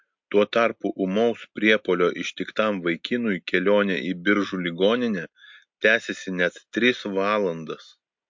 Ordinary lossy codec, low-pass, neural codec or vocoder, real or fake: MP3, 48 kbps; 7.2 kHz; none; real